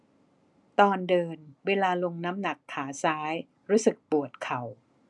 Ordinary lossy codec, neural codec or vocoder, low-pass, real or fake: none; none; 10.8 kHz; real